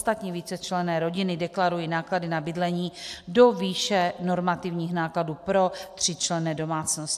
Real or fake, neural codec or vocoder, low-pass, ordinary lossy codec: real; none; 14.4 kHz; AAC, 96 kbps